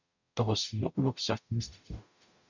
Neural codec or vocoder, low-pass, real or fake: codec, 44.1 kHz, 0.9 kbps, DAC; 7.2 kHz; fake